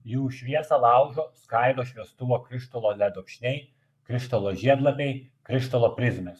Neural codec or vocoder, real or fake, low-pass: codec, 44.1 kHz, 7.8 kbps, Pupu-Codec; fake; 14.4 kHz